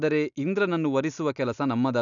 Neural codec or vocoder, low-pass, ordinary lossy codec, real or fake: none; 7.2 kHz; none; real